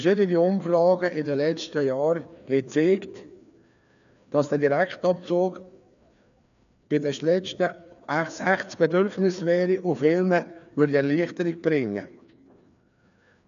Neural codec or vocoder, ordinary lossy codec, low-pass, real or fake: codec, 16 kHz, 2 kbps, FreqCodec, larger model; none; 7.2 kHz; fake